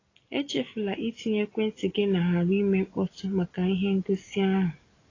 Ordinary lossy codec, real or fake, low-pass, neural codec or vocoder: AAC, 32 kbps; real; 7.2 kHz; none